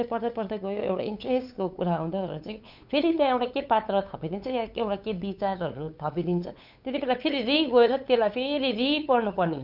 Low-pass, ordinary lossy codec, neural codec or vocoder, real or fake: 5.4 kHz; none; codec, 16 kHz, 8 kbps, FunCodec, trained on LibriTTS, 25 frames a second; fake